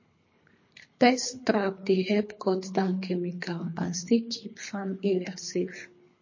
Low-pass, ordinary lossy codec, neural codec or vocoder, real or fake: 7.2 kHz; MP3, 32 kbps; codec, 24 kHz, 3 kbps, HILCodec; fake